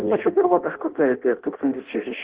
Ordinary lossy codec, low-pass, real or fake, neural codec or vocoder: Opus, 16 kbps; 3.6 kHz; fake; codec, 16 kHz in and 24 kHz out, 0.6 kbps, FireRedTTS-2 codec